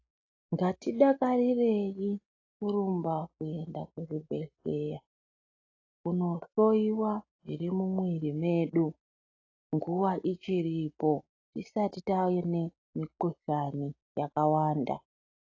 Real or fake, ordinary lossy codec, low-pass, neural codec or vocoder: real; AAC, 32 kbps; 7.2 kHz; none